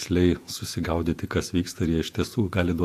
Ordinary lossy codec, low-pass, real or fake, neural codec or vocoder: AAC, 64 kbps; 14.4 kHz; fake; vocoder, 48 kHz, 128 mel bands, Vocos